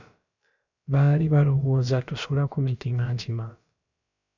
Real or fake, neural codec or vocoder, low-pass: fake; codec, 16 kHz, about 1 kbps, DyCAST, with the encoder's durations; 7.2 kHz